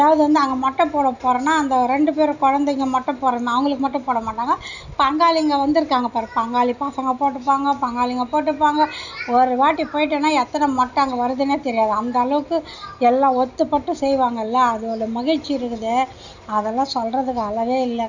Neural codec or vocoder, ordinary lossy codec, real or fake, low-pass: none; none; real; 7.2 kHz